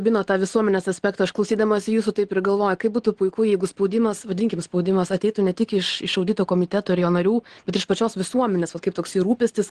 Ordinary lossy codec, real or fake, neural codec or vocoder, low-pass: Opus, 16 kbps; real; none; 9.9 kHz